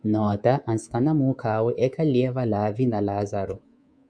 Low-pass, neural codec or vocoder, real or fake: 9.9 kHz; codec, 24 kHz, 3.1 kbps, DualCodec; fake